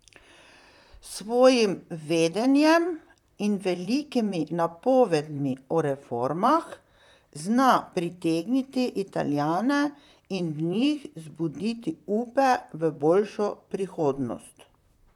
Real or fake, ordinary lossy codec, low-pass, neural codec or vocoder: fake; none; 19.8 kHz; vocoder, 44.1 kHz, 128 mel bands, Pupu-Vocoder